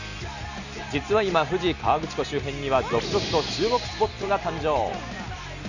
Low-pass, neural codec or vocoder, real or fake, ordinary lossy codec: 7.2 kHz; none; real; none